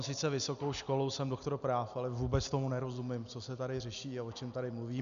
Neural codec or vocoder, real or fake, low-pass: none; real; 7.2 kHz